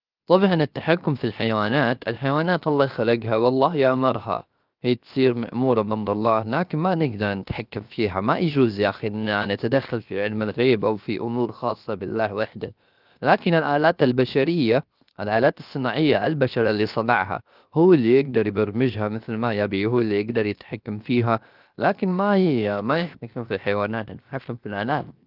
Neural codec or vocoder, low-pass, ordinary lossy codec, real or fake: codec, 16 kHz, 0.7 kbps, FocalCodec; 5.4 kHz; Opus, 24 kbps; fake